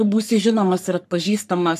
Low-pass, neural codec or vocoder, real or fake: 14.4 kHz; codec, 44.1 kHz, 3.4 kbps, Pupu-Codec; fake